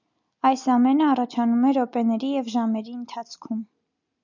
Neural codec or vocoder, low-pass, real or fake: none; 7.2 kHz; real